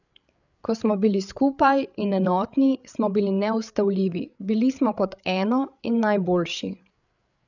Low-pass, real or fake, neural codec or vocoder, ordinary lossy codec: 7.2 kHz; fake; codec, 16 kHz, 8 kbps, FreqCodec, larger model; none